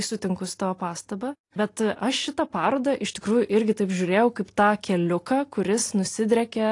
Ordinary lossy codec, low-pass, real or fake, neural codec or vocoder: AAC, 48 kbps; 10.8 kHz; real; none